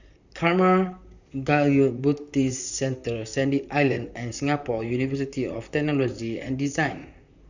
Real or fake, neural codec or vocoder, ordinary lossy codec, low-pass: fake; vocoder, 44.1 kHz, 128 mel bands, Pupu-Vocoder; none; 7.2 kHz